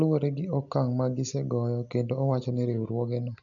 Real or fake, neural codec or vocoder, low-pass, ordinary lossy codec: real; none; 7.2 kHz; none